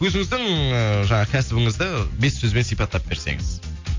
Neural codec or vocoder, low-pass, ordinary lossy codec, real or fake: none; 7.2 kHz; MP3, 48 kbps; real